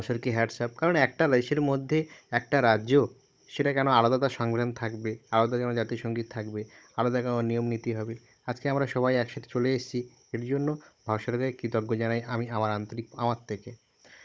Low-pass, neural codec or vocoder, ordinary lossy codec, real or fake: none; codec, 16 kHz, 16 kbps, FunCodec, trained on Chinese and English, 50 frames a second; none; fake